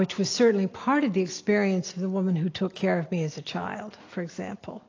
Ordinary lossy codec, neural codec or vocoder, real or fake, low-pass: AAC, 32 kbps; none; real; 7.2 kHz